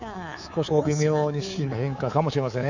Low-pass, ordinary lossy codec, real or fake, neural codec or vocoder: 7.2 kHz; none; fake; codec, 24 kHz, 3.1 kbps, DualCodec